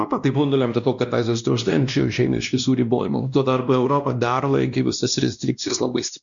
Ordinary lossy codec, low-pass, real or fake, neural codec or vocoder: MP3, 96 kbps; 7.2 kHz; fake; codec, 16 kHz, 1 kbps, X-Codec, WavLM features, trained on Multilingual LibriSpeech